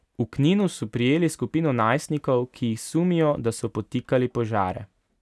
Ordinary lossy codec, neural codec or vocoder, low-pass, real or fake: none; none; none; real